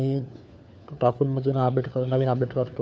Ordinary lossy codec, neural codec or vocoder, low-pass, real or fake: none; codec, 16 kHz, 4 kbps, FunCodec, trained on LibriTTS, 50 frames a second; none; fake